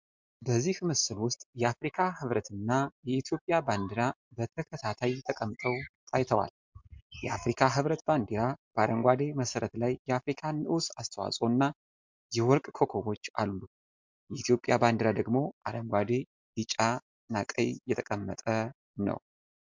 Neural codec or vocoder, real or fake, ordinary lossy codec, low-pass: none; real; MP3, 64 kbps; 7.2 kHz